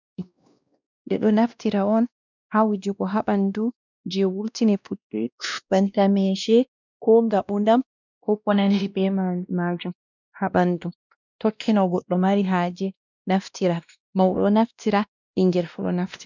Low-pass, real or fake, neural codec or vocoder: 7.2 kHz; fake; codec, 16 kHz, 1 kbps, X-Codec, WavLM features, trained on Multilingual LibriSpeech